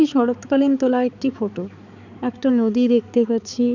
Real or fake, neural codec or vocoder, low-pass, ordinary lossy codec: fake; codec, 16 kHz, 4 kbps, X-Codec, HuBERT features, trained on balanced general audio; 7.2 kHz; none